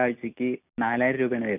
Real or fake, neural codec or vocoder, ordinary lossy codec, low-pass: real; none; none; 3.6 kHz